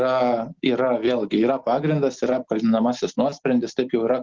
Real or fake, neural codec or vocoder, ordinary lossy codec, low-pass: real; none; Opus, 16 kbps; 7.2 kHz